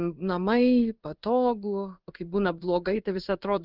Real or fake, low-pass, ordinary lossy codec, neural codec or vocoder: fake; 5.4 kHz; Opus, 32 kbps; codec, 16 kHz in and 24 kHz out, 1 kbps, XY-Tokenizer